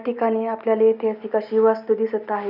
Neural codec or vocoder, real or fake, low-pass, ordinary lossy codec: none; real; 5.4 kHz; none